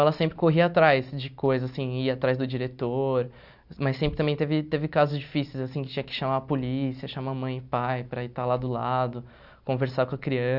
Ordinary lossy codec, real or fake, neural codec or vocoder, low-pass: none; real; none; 5.4 kHz